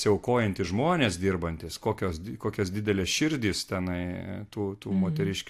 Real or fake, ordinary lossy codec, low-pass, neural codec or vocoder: real; AAC, 64 kbps; 14.4 kHz; none